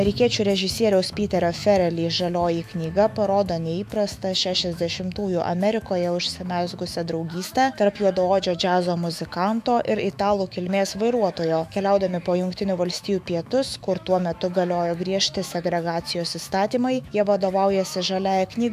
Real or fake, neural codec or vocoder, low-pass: fake; autoencoder, 48 kHz, 128 numbers a frame, DAC-VAE, trained on Japanese speech; 14.4 kHz